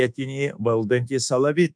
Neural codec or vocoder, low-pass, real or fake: codec, 24 kHz, 1.2 kbps, DualCodec; 9.9 kHz; fake